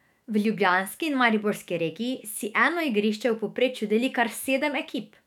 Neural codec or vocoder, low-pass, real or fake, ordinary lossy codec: autoencoder, 48 kHz, 128 numbers a frame, DAC-VAE, trained on Japanese speech; 19.8 kHz; fake; none